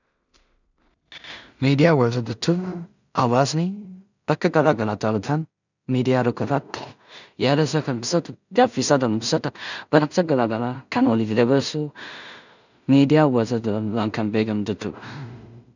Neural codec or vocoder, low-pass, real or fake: codec, 16 kHz in and 24 kHz out, 0.4 kbps, LongCat-Audio-Codec, two codebook decoder; 7.2 kHz; fake